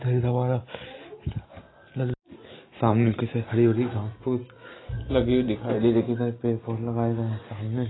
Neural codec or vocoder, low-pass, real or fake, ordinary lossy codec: none; 7.2 kHz; real; AAC, 16 kbps